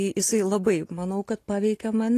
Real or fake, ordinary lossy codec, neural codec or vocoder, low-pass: real; AAC, 48 kbps; none; 14.4 kHz